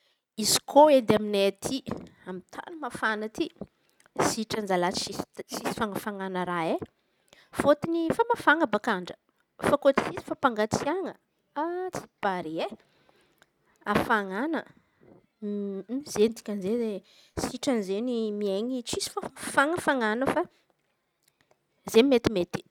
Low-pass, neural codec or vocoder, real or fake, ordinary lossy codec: 19.8 kHz; none; real; none